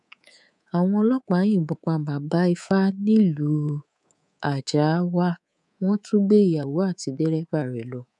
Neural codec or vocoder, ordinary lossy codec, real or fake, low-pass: codec, 24 kHz, 3.1 kbps, DualCodec; none; fake; 10.8 kHz